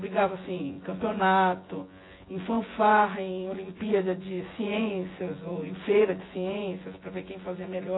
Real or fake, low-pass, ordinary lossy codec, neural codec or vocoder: fake; 7.2 kHz; AAC, 16 kbps; vocoder, 24 kHz, 100 mel bands, Vocos